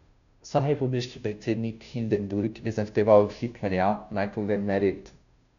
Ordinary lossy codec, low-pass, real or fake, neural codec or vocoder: none; 7.2 kHz; fake; codec, 16 kHz, 0.5 kbps, FunCodec, trained on Chinese and English, 25 frames a second